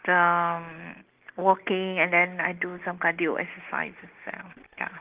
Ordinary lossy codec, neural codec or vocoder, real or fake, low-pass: Opus, 16 kbps; none; real; 3.6 kHz